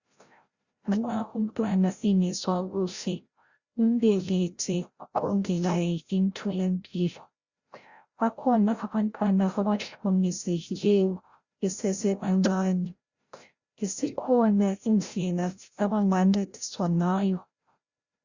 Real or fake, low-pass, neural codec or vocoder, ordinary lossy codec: fake; 7.2 kHz; codec, 16 kHz, 0.5 kbps, FreqCodec, larger model; Opus, 64 kbps